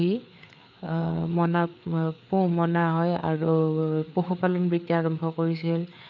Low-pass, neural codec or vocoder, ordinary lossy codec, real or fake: 7.2 kHz; codec, 16 kHz, 4 kbps, FreqCodec, larger model; none; fake